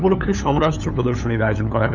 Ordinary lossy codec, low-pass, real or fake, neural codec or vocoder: none; 7.2 kHz; fake; codec, 16 kHz, 8 kbps, FunCodec, trained on LibriTTS, 25 frames a second